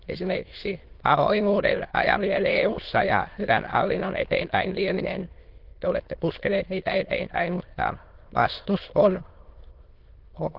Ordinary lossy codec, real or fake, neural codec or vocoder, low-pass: Opus, 16 kbps; fake; autoencoder, 22.05 kHz, a latent of 192 numbers a frame, VITS, trained on many speakers; 5.4 kHz